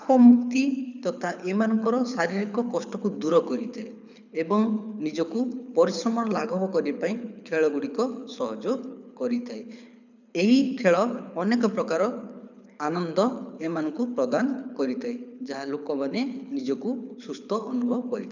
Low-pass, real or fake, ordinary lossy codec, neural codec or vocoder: 7.2 kHz; fake; none; codec, 24 kHz, 6 kbps, HILCodec